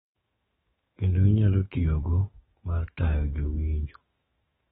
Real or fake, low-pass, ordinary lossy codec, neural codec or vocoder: fake; 19.8 kHz; AAC, 16 kbps; autoencoder, 48 kHz, 128 numbers a frame, DAC-VAE, trained on Japanese speech